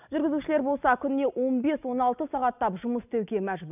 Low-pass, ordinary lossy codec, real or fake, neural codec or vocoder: 3.6 kHz; none; real; none